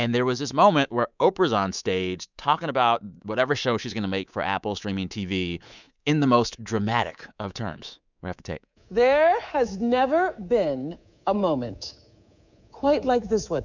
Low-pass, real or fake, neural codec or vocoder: 7.2 kHz; fake; codec, 24 kHz, 3.1 kbps, DualCodec